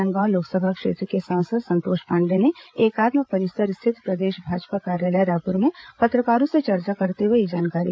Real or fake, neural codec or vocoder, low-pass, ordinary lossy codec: fake; codec, 16 kHz, 8 kbps, FreqCodec, larger model; none; none